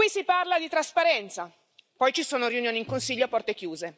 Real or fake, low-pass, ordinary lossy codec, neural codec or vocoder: real; none; none; none